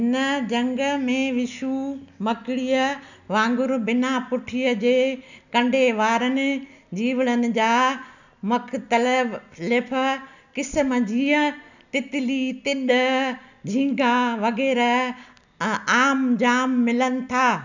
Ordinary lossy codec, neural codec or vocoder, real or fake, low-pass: none; none; real; 7.2 kHz